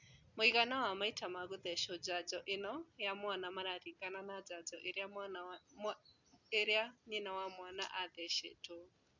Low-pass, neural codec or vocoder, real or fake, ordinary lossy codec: 7.2 kHz; none; real; none